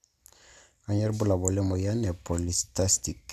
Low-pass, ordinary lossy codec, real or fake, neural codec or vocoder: 14.4 kHz; none; real; none